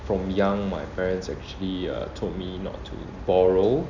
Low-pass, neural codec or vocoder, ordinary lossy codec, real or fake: 7.2 kHz; none; none; real